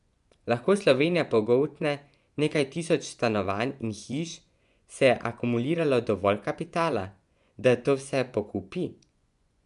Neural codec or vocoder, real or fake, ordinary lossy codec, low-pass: vocoder, 24 kHz, 100 mel bands, Vocos; fake; none; 10.8 kHz